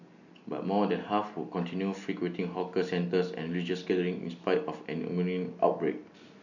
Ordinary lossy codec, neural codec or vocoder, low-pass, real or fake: none; none; 7.2 kHz; real